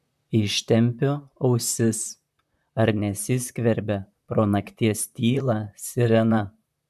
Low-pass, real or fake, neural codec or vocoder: 14.4 kHz; fake; vocoder, 44.1 kHz, 128 mel bands, Pupu-Vocoder